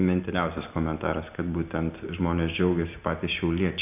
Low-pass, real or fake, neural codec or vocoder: 3.6 kHz; real; none